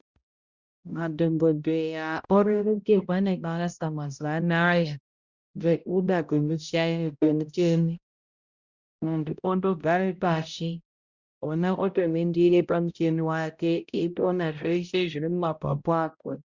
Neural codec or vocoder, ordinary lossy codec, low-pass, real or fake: codec, 16 kHz, 0.5 kbps, X-Codec, HuBERT features, trained on balanced general audio; Opus, 64 kbps; 7.2 kHz; fake